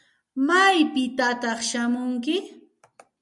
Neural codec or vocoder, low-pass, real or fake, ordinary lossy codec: none; 10.8 kHz; real; MP3, 64 kbps